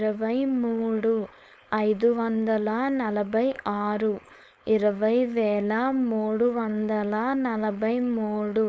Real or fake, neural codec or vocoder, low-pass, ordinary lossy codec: fake; codec, 16 kHz, 4.8 kbps, FACodec; none; none